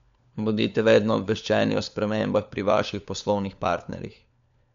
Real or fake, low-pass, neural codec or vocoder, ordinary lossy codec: fake; 7.2 kHz; codec, 16 kHz, 4 kbps, FunCodec, trained on LibriTTS, 50 frames a second; MP3, 64 kbps